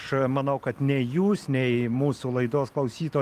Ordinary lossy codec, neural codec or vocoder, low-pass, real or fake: Opus, 32 kbps; vocoder, 44.1 kHz, 128 mel bands every 256 samples, BigVGAN v2; 14.4 kHz; fake